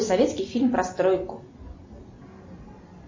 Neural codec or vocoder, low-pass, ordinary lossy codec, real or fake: none; 7.2 kHz; MP3, 32 kbps; real